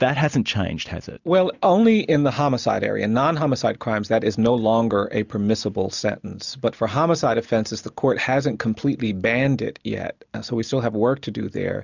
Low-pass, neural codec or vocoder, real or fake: 7.2 kHz; none; real